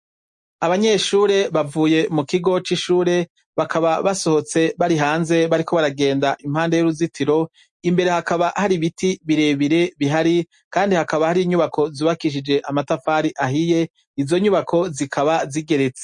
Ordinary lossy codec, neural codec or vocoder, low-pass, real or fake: MP3, 48 kbps; none; 10.8 kHz; real